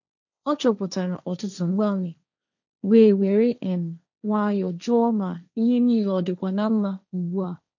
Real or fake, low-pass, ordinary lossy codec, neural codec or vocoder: fake; 7.2 kHz; none; codec, 16 kHz, 1.1 kbps, Voila-Tokenizer